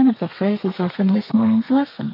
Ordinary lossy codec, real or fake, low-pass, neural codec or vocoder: MP3, 32 kbps; fake; 5.4 kHz; codec, 32 kHz, 1.9 kbps, SNAC